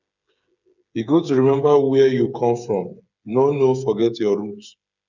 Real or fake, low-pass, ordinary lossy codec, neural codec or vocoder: fake; 7.2 kHz; none; codec, 16 kHz, 8 kbps, FreqCodec, smaller model